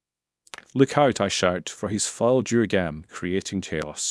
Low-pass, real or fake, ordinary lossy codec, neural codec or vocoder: none; fake; none; codec, 24 kHz, 0.9 kbps, WavTokenizer, small release